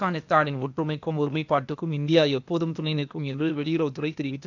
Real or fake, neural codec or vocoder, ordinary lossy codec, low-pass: fake; codec, 16 kHz, 0.8 kbps, ZipCodec; none; 7.2 kHz